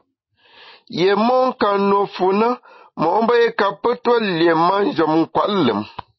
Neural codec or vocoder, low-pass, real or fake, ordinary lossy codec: none; 7.2 kHz; real; MP3, 24 kbps